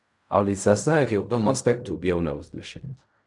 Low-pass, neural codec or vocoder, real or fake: 10.8 kHz; codec, 16 kHz in and 24 kHz out, 0.4 kbps, LongCat-Audio-Codec, fine tuned four codebook decoder; fake